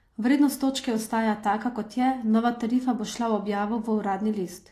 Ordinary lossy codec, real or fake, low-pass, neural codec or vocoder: AAC, 48 kbps; real; 14.4 kHz; none